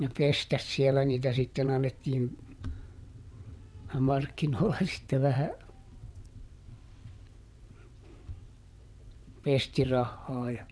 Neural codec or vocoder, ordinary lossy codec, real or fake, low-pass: vocoder, 22.05 kHz, 80 mel bands, WaveNeXt; none; fake; none